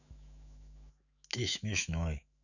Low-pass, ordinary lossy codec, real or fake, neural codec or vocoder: 7.2 kHz; none; fake; autoencoder, 48 kHz, 128 numbers a frame, DAC-VAE, trained on Japanese speech